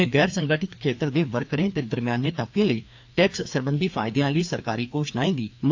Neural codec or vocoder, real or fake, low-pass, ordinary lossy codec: codec, 16 kHz, 2 kbps, FreqCodec, larger model; fake; 7.2 kHz; AAC, 48 kbps